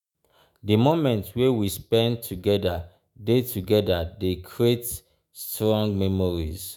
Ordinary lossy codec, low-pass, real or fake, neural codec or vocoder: none; none; fake; autoencoder, 48 kHz, 128 numbers a frame, DAC-VAE, trained on Japanese speech